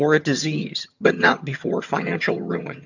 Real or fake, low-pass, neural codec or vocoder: fake; 7.2 kHz; vocoder, 22.05 kHz, 80 mel bands, HiFi-GAN